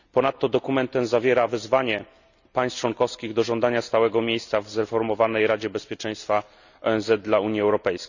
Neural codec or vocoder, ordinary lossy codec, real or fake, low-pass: none; none; real; 7.2 kHz